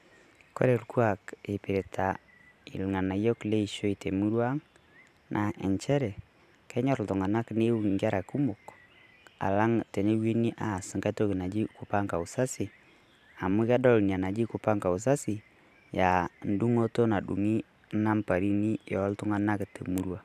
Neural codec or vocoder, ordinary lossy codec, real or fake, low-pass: vocoder, 44.1 kHz, 128 mel bands every 512 samples, BigVGAN v2; none; fake; 14.4 kHz